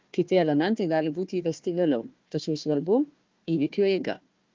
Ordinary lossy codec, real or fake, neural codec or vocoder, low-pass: Opus, 32 kbps; fake; codec, 16 kHz, 1 kbps, FunCodec, trained on Chinese and English, 50 frames a second; 7.2 kHz